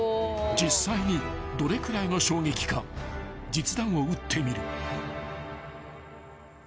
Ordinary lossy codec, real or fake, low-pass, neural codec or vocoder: none; real; none; none